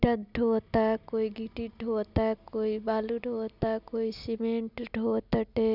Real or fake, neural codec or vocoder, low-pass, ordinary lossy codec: real; none; 5.4 kHz; none